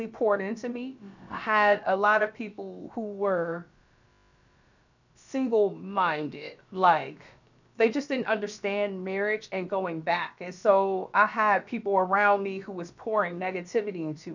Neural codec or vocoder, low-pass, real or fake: codec, 16 kHz, about 1 kbps, DyCAST, with the encoder's durations; 7.2 kHz; fake